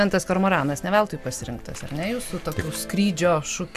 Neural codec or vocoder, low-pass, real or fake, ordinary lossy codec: none; 14.4 kHz; real; MP3, 96 kbps